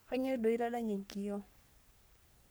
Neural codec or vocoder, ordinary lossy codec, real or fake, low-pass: codec, 44.1 kHz, 3.4 kbps, Pupu-Codec; none; fake; none